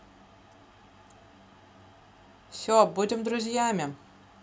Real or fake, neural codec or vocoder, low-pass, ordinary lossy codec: real; none; none; none